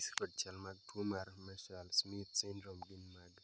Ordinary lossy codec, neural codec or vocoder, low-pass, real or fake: none; none; none; real